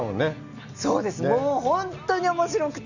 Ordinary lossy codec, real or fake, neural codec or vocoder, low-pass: none; real; none; 7.2 kHz